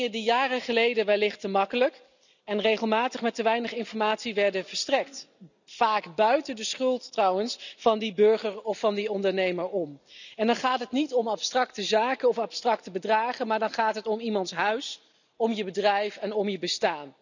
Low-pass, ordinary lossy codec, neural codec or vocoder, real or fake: 7.2 kHz; none; none; real